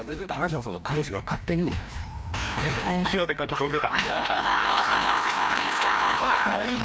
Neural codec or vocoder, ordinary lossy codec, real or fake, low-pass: codec, 16 kHz, 1 kbps, FreqCodec, larger model; none; fake; none